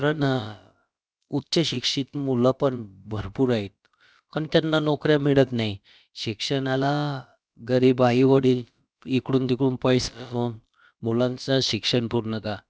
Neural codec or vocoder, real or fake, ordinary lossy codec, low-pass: codec, 16 kHz, about 1 kbps, DyCAST, with the encoder's durations; fake; none; none